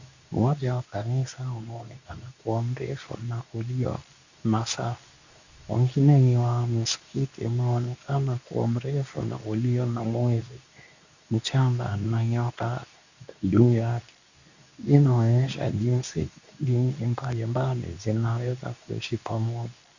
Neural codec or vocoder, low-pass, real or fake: codec, 24 kHz, 0.9 kbps, WavTokenizer, medium speech release version 2; 7.2 kHz; fake